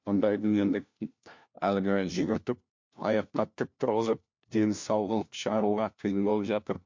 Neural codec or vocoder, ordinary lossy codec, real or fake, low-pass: codec, 16 kHz, 1 kbps, FunCodec, trained on LibriTTS, 50 frames a second; MP3, 48 kbps; fake; 7.2 kHz